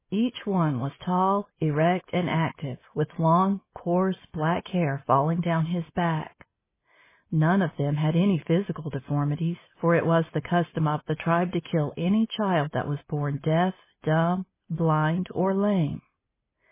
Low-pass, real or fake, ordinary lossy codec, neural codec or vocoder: 3.6 kHz; real; MP3, 16 kbps; none